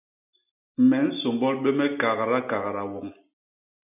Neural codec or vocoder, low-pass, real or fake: none; 3.6 kHz; real